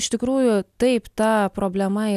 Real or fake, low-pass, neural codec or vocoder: real; 14.4 kHz; none